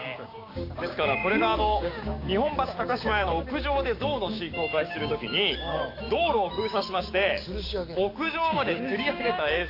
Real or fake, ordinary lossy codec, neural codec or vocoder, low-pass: fake; AAC, 32 kbps; codec, 16 kHz, 6 kbps, DAC; 5.4 kHz